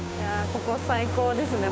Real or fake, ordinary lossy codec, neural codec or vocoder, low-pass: fake; none; codec, 16 kHz, 6 kbps, DAC; none